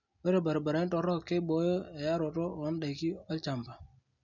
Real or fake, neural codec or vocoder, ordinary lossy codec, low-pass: real; none; none; 7.2 kHz